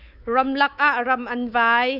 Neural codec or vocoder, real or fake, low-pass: codec, 24 kHz, 3.1 kbps, DualCodec; fake; 5.4 kHz